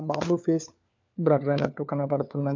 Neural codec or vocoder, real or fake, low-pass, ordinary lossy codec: codec, 16 kHz, 4 kbps, FunCodec, trained on LibriTTS, 50 frames a second; fake; 7.2 kHz; MP3, 64 kbps